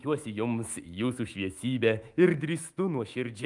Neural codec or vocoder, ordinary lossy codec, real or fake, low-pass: autoencoder, 48 kHz, 128 numbers a frame, DAC-VAE, trained on Japanese speech; Opus, 32 kbps; fake; 10.8 kHz